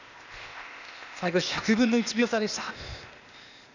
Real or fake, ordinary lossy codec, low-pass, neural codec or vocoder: fake; none; 7.2 kHz; codec, 16 kHz, 0.8 kbps, ZipCodec